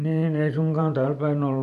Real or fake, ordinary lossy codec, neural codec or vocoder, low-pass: fake; AAC, 96 kbps; vocoder, 44.1 kHz, 128 mel bands every 512 samples, BigVGAN v2; 14.4 kHz